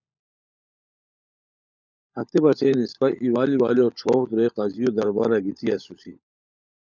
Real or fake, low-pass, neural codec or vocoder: fake; 7.2 kHz; codec, 16 kHz, 16 kbps, FunCodec, trained on LibriTTS, 50 frames a second